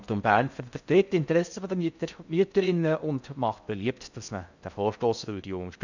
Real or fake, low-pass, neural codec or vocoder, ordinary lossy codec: fake; 7.2 kHz; codec, 16 kHz in and 24 kHz out, 0.6 kbps, FocalCodec, streaming, 4096 codes; none